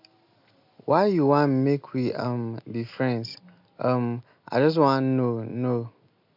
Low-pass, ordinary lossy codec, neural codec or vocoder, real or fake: 5.4 kHz; MP3, 48 kbps; none; real